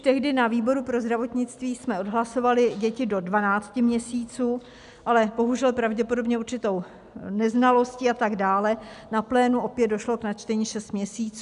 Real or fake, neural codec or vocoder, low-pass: real; none; 10.8 kHz